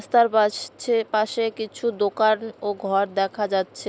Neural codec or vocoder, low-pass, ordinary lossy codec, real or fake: none; none; none; real